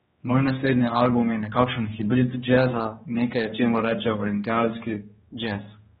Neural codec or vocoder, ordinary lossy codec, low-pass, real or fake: codec, 16 kHz, 2 kbps, X-Codec, HuBERT features, trained on general audio; AAC, 16 kbps; 7.2 kHz; fake